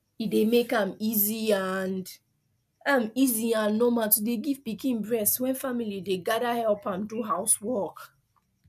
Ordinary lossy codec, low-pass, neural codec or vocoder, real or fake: none; 14.4 kHz; none; real